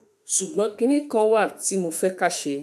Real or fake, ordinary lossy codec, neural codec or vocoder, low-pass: fake; none; autoencoder, 48 kHz, 32 numbers a frame, DAC-VAE, trained on Japanese speech; 14.4 kHz